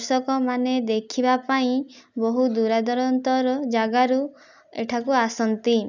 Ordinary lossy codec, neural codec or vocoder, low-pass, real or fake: none; none; 7.2 kHz; real